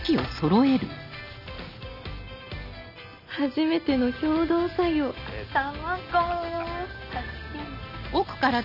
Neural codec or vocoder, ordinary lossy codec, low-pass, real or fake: none; none; 5.4 kHz; real